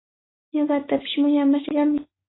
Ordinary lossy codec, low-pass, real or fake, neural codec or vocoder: AAC, 16 kbps; 7.2 kHz; fake; autoencoder, 48 kHz, 128 numbers a frame, DAC-VAE, trained on Japanese speech